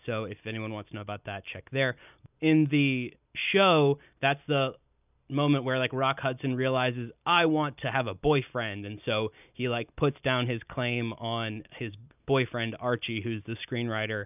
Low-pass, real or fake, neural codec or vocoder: 3.6 kHz; real; none